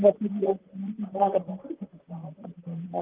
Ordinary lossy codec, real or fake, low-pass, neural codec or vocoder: Opus, 32 kbps; fake; 3.6 kHz; vocoder, 44.1 kHz, 128 mel bands, Pupu-Vocoder